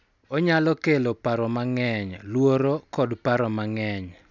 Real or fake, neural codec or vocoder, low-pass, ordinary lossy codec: real; none; 7.2 kHz; none